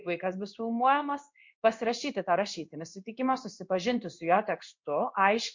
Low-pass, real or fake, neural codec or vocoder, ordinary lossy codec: 7.2 kHz; fake; codec, 16 kHz in and 24 kHz out, 1 kbps, XY-Tokenizer; MP3, 48 kbps